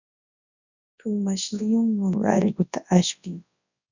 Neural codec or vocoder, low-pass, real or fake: codec, 24 kHz, 0.9 kbps, WavTokenizer, large speech release; 7.2 kHz; fake